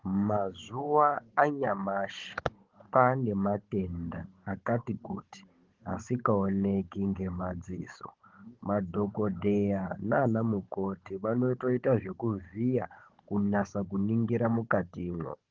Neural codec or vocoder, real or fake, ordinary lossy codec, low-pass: codec, 16 kHz, 16 kbps, FunCodec, trained on Chinese and English, 50 frames a second; fake; Opus, 16 kbps; 7.2 kHz